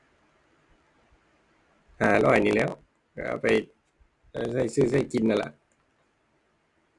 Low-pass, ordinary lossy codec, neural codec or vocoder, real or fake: 10.8 kHz; AAC, 64 kbps; none; real